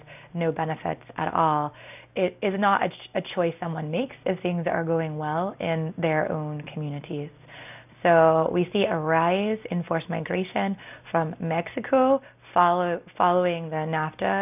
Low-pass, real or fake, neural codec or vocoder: 3.6 kHz; real; none